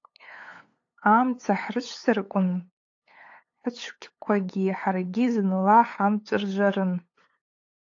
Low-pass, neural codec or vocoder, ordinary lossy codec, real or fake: 7.2 kHz; codec, 16 kHz, 8 kbps, FunCodec, trained on LibriTTS, 25 frames a second; AAC, 48 kbps; fake